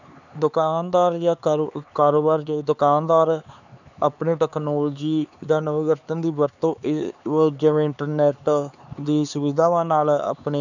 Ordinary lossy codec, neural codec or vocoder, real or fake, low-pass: none; codec, 16 kHz, 4 kbps, X-Codec, HuBERT features, trained on LibriSpeech; fake; 7.2 kHz